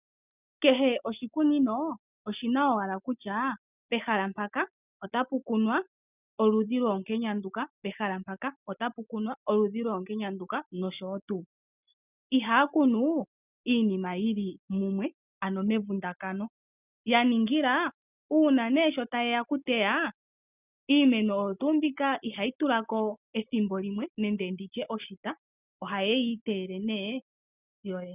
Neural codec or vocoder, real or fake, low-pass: none; real; 3.6 kHz